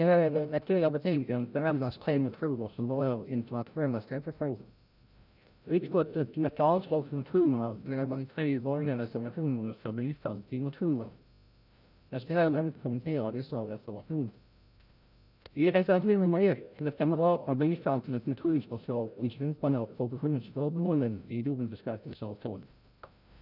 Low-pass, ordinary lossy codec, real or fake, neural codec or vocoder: 5.4 kHz; none; fake; codec, 16 kHz, 0.5 kbps, FreqCodec, larger model